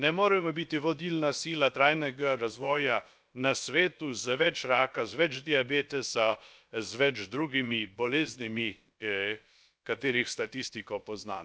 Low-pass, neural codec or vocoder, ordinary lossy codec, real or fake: none; codec, 16 kHz, about 1 kbps, DyCAST, with the encoder's durations; none; fake